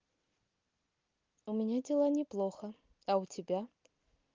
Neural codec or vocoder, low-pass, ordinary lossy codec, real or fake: none; 7.2 kHz; Opus, 32 kbps; real